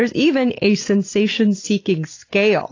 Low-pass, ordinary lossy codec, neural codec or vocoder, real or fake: 7.2 kHz; AAC, 32 kbps; none; real